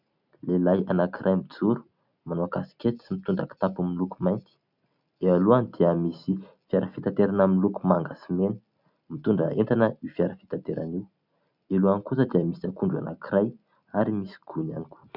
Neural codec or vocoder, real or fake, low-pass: none; real; 5.4 kHz